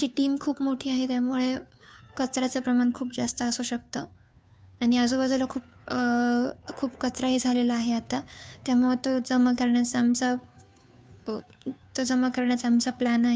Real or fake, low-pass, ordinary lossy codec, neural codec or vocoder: fake; none; none; codec, 16 kHz, 2 kbps, FunCodec, trained on Chinese and English, 25 frames a second